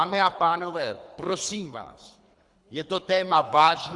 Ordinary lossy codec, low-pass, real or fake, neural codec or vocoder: Opus, 64 kbps; 10.8 kHz; fake; codec, 24 kHz, 3 kbps, HILCodec